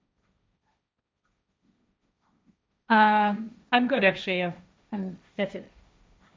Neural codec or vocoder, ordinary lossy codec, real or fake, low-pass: codec, 16 kHz, 1.1 kbps, Voila-Tokenizer; none; fake; none